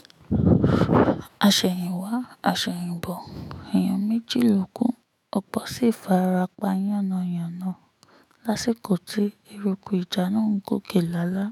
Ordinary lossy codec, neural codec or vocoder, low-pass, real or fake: none; autoencoder, 48 kHz, 128 numbers a frame, DAC-VAE, trained on Japanese speech; 19.8 kHz; fake